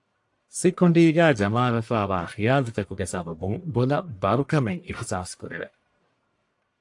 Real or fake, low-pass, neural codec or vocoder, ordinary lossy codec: fake; 10.8 kHz; codec, 44.1 kHz, 1.7 kbps, Pupu-Codec; AAC, 64 kbps